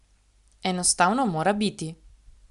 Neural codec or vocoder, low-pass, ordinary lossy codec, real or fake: none; 10.8 kHz; Opus, 64 kbps; real